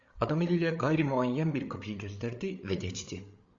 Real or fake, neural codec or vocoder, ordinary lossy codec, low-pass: fake; codec, 16 kHz, 8 kbps, FreqCodec, larger model; Opus, 64 kbps; 7.2 kHz